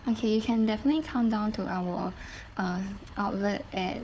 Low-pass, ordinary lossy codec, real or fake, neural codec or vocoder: none; none; fake; codec, 16 kHz, 4 kbps, FunCodec, trained on Chinese and English, 50 frames a second